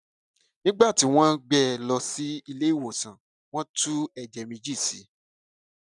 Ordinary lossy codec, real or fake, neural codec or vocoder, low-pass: none; real; none; 10.8 kHz